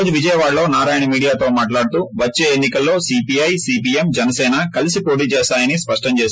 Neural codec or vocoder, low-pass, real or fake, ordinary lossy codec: none; none; real; none